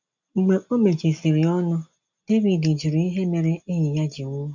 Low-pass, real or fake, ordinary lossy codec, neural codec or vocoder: 7.2 kHz; real; none; none